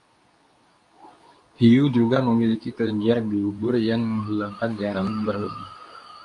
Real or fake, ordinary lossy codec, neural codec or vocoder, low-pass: fake; AAC, 48 kbps; codec, 24 kHz, 0.9 kbps, WavTokenizer, medium speech release version 2; 10.8 kHz